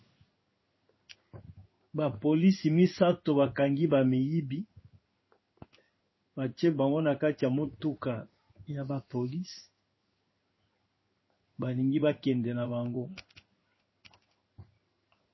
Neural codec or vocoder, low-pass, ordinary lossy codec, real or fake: codec, 16 kHz in and 24 kHz out, 1 kbps, XY-Tokenizer; 7.2 kHz; MP3, 24 kbps; fake